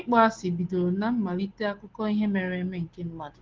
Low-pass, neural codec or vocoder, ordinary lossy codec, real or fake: 7.2 kHz; none; Opus, 16 kbps; real